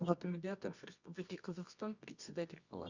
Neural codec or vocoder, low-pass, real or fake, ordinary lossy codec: codec, 24 kHz, 0.9 kbps, WavTokenizer, medium music audio release; 7.2 kHz; fake; Opus, 64 kbps